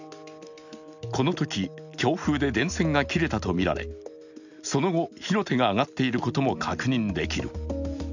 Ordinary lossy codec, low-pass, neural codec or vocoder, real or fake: none; 7.2 kHz; none; real